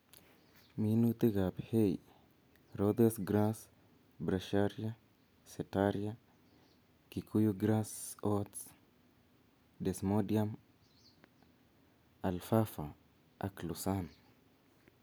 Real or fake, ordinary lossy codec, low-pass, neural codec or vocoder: real; none; none; none